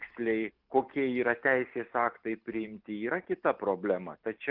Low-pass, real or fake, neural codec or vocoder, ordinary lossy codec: 5.4 kHz; real; none; Opus, 32 kbps